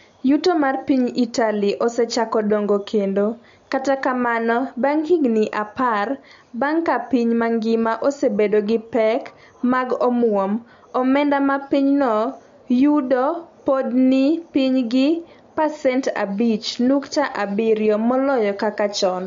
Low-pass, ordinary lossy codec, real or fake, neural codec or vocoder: 7.2 kHz; MP3, 48 kbps; real; none